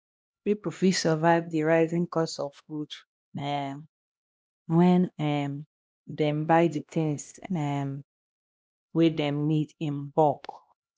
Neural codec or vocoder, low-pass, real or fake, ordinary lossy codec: codec, 16 kHz, 1 kbps, X-Codec, HuBERT features, trained on LibriSpeech; none; fake; none